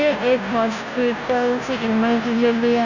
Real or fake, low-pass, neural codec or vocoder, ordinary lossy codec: fake; 7.2 kHz; codec, 16 kHz, 0.5 kbps, FunCodec, trained on Chinese and English, 25 frames a second; none